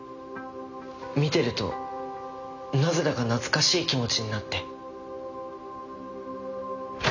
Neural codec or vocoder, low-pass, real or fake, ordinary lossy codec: none; 7.2 kHz; real; none